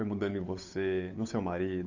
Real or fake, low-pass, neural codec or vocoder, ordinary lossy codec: fake; 7.2 kHz; codec, 16 kHz, 8 kbps, FunCodec, trained on Chinese and English, 25 frames a second; AAC, 48 kbps